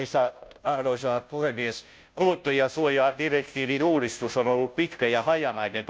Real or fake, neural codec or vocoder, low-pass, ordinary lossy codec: fake; codec, 16 kHz, 0.5 kbps, FunCodec, trained on Chinese and English, 25 frames a second; none; none